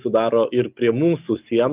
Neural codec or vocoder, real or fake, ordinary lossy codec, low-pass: none; real; Opus, 24 kbps; 3.6 kHz